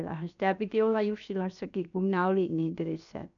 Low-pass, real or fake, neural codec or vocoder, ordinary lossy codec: 7.2 kHz; fake; codec, 16 kHz, 0.7 kbps, FocalCodec; none